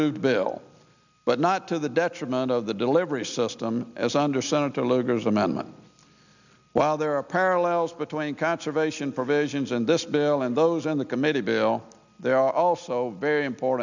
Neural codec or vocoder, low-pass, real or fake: none; 7.2 kHz; real